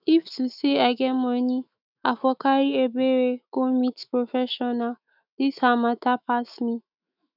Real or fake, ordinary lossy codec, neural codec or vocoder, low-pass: fake; none; autoencoder, 48 kHz, 128 numbers a frame, DAC-VAE, trained on Japanese speech; 5.4 kHz